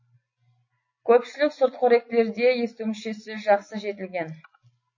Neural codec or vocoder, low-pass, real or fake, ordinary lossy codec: none; 7.2 kHz; real; MP3, 32 kbps